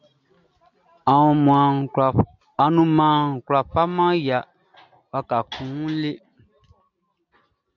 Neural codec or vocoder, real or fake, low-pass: none; real; 7.2 kHz